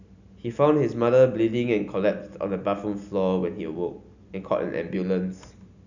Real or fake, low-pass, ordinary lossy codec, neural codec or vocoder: real; 7.2 kHz; none; none